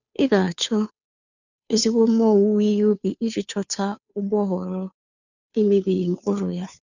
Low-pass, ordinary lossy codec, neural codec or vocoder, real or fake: 7.2 kHz; none; codec, 16 kHz, 2 kbps, FunCodec, trained on Chinese and English, 25 frames a second; fake